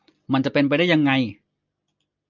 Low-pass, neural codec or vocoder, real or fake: 7.2 kHz; none; real